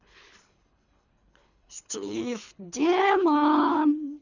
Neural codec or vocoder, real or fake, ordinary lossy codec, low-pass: codec, 24 kHz, 3 kbps, HILCodec; fake; none; 7.2 kHz